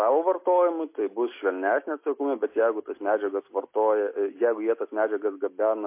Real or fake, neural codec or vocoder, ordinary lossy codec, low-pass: real; none; MP3, 24 kbps; 3.6 kHz